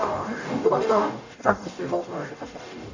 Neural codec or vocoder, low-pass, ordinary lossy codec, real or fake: codec, 44.1 kHz, 0.9 kbps, DAC; 7.2 kHz; AAC, 48 kbps; fake